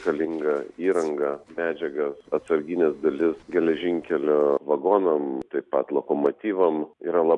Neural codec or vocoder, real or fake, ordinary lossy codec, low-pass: none; real; MP3, 96 kbps; 10.8 kHz